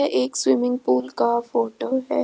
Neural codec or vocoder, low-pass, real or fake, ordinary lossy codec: none; none; real; none